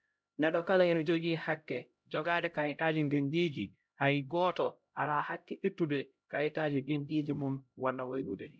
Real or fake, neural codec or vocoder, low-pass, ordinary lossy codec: fake; codec, 16 kHz, 0.5 kbps, X-Codec, HuBERT features, trained on LibriSpeech; none; none